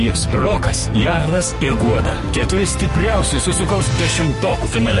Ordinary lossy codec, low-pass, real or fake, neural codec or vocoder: MP3, 48 kbps; 14.4 kHz; fake; codec, 44.1 kHz, 2.6 kbps, SNAC